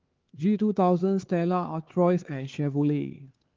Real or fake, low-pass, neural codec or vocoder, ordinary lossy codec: fake; 7.2 kHz; codec, 16 kHz, 4 kbps, X-Codec, HuBERT features, trained on LibriSpeech; Opus, 16 kbps